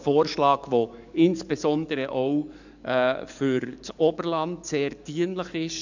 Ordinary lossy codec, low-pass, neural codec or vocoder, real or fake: none; 7.2 kHz; codec, 44.1 kHz, 7.8 kbps, DAC; fake